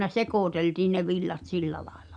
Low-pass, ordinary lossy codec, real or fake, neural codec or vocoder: 9.9 kHz; none; real; none